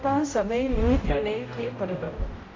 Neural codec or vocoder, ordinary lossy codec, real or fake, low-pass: codec, 16 kHz, 0.5 kbps, X-Codec, HuBERT features, trained on general audio; AAC, 32 kbps; fake; 7.2 kHz